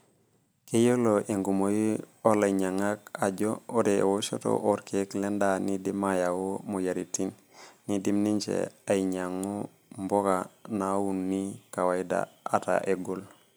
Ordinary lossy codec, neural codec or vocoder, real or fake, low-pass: none; none; real; none